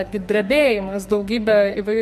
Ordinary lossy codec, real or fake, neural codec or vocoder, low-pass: MP3, 64 kbps; fake; codec, 44.1 kHz, 2.6 kbps, SNAC; 14.4 kHz